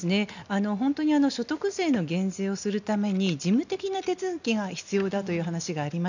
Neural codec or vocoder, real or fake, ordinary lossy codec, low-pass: none; real; none; 7.2 kHz